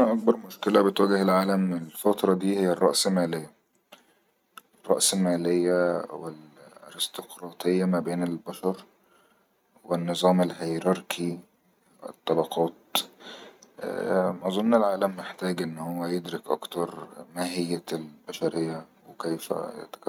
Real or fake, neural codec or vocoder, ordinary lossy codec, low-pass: real; none; none; 19.8 kHz